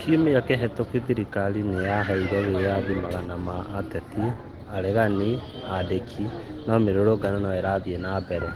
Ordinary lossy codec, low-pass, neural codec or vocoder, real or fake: Opus, 16 kbps; 19.8 kHz; none; real